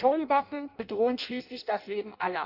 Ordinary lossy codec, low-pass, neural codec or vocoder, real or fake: none; 5.4 kHz; codec, 16 kHz in and 24 kHz out, 0.6 kbps, FireRedTTS-2 codec; fake